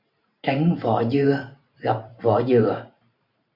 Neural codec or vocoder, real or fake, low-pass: vocoder, 44.1 kHz, 128 mel bands every 512 samples, BigVGAN v2; fake; 5.4 kHz